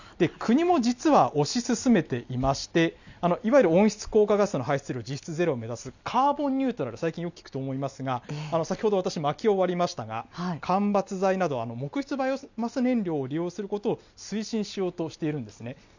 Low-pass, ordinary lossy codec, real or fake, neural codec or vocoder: 7.2 kHz; none; real; none